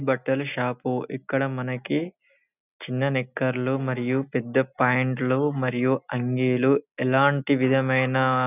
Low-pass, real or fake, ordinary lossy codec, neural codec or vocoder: 3.6 kHz; real; none; none